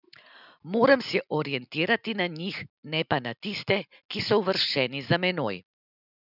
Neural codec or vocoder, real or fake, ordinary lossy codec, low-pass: none; real; none; 5.4 kHz